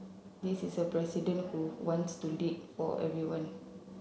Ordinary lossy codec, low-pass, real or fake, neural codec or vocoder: none; none; real; none